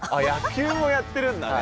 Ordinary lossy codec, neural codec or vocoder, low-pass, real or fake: none; none; none; real